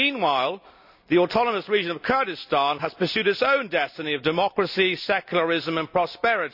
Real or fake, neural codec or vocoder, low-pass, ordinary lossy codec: real; none; 5.4 kHz; none